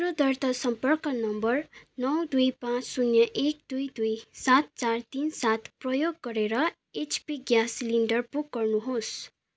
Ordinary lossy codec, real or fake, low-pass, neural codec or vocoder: none; real; none; none